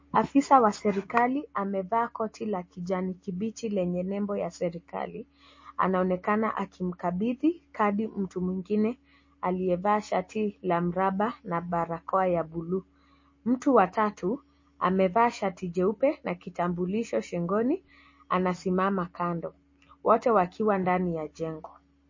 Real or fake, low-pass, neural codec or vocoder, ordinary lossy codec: real; 7.2 kHz; none; MP3, 32 kbps